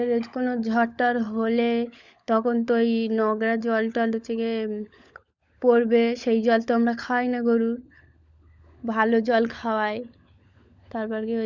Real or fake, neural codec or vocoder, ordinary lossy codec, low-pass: fake; codec, 16 kHz, 16 kbps, FunCodec, trained on LibriTTS, 50 frames a second; Opus, 64 kbps; 7.2 kHz